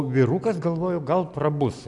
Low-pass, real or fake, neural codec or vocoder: 10.8 kHz; real; none